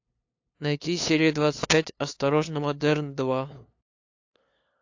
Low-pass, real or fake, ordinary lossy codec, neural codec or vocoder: 7.2 kHz; fake; AAC, 48 kbps; codec, 16 kHz, 2 kbps, FunCodec, trained on LibriTTS, 25 frames a second